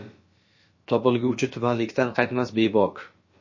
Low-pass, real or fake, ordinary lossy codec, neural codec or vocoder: 7.2 kHz; fake; MP3, 32 kbps; codec, 16 kHz, about 1 kbps, DyCAST, with the encoder's durations